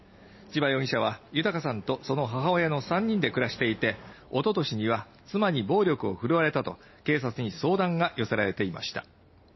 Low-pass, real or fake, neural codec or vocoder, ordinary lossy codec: 7.2 kHz; real; none; MP3, 24 kbps